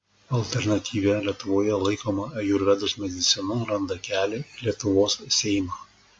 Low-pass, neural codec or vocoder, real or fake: 9.9 kHz; none; real